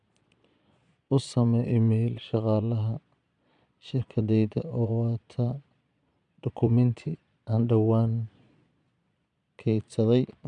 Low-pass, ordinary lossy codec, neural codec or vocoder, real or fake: 9.9 kHz; none; vocoder, 22.05 kHz, 80 mel bands, Vocos; fake